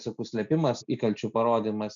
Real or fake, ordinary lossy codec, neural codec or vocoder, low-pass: real; MP3, 96 kbps; none; 7.2 kHz